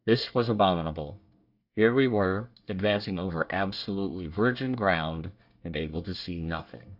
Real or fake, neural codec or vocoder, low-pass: fake; codec, 24 kHz, 1 kbps, SNAC; 5.4 kHz